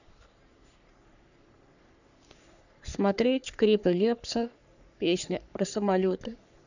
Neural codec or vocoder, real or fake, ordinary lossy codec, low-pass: codec, 44.1 kHz, 3.4 kbps, Pupu-Codec; fake; none; 7.2 kHz